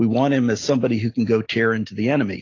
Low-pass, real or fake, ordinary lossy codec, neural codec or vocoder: 7.2 kHz; real; AAC, 32 kbps; none